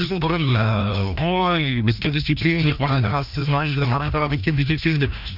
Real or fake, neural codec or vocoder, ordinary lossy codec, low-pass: fake; codec, 16 kHz, 1 kbps, FreqCodec, larger model; none; 5.4 kHz